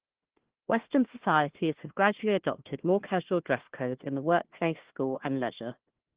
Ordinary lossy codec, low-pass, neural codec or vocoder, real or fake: Opus, 16 kbps; 3.6 kHz; codec, 16 kHz, 1 kbps, FunCodec, trained on Chinese and English, 50 frames a second; fake